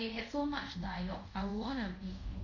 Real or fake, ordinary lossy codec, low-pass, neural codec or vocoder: fake; none; 7.2 kHz; codec, 24 kHz, 0.5 kbps, DualCodec